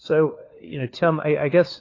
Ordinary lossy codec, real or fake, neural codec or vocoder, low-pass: AAC, 32 kbps; fake; codec, 16 kHz, 4 kbps, FunCodec, trained on LibriTTS, 50 frames a second; 7.2 kHz